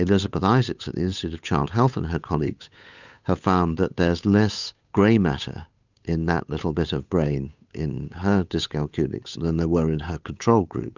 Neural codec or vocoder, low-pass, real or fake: codec, 16 kHz, 8 kbps, FunCodec, trained on Chinese and English, 25 frames a second; 7.2 kHz; fake